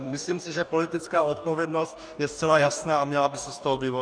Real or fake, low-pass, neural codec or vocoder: fake; 9.9 kHz; codec, 44.1 kHz, 2.6 kbps, DAC